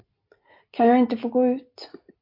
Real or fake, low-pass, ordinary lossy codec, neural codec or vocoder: real; 5.4 kHz; MP3, 32 kbps; none